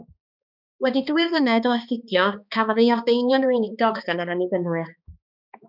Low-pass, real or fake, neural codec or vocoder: 5.4 kHz; fake; codec, 16 kHz, 2 kbps, X-Codec, HuBERT features, trained on balanced general audio